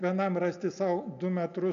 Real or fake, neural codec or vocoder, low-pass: real; none; 7.2 kHz